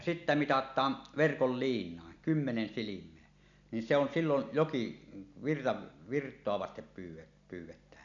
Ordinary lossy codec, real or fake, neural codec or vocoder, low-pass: none; real; none; 7.2 kHz